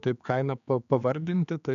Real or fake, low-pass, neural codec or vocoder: fake; 7.2 kHz; codec, 16 kHz, 4 kbps, X-Codec, HuBERT features, trained on general audio